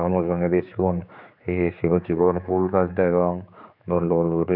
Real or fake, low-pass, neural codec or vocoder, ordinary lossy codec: fake; 5.4 kHz; codec, 16 kHz, 4 kbps, X-Codec, HuBERT features, trained on general audio; none